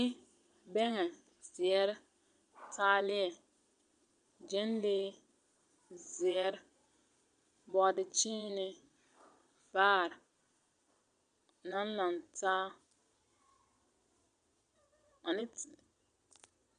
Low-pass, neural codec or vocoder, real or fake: 9.9 kHz; vocoder, 22.05 kHz, 80 mel bands, Vocos; fake